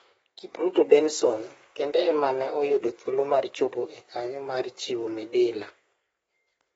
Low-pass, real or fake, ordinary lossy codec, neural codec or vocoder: 14.4 kHz; fake; AAC, 24 kbps; codec, 32 kHz, 1.9 kbps, SNAC